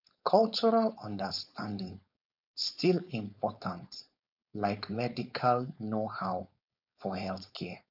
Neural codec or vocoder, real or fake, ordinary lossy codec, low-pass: codec, 16 kHz, 4.8 kbps, FACodec; fake; none; 5.4 kHz